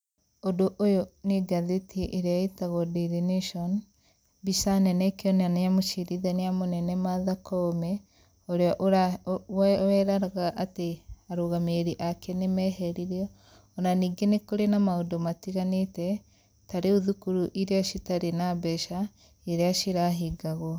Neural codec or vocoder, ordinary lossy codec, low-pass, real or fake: none; none; none; real